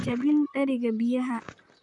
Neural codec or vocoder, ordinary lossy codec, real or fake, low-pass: vocoder, 44.1 kHz, 128 mel bands, Pupu-Vocoder; none; fake; 10.8 kHz